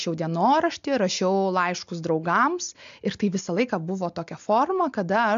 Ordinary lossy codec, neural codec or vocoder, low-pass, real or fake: MP3, 64 kbps; none; 7.2 kHz; real